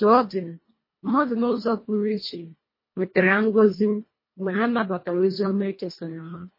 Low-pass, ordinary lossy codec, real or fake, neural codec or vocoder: 5.4 kHz; MP3, 24 kbps; fake; codec, 24 kHz, 1.5 kbps, HILCodec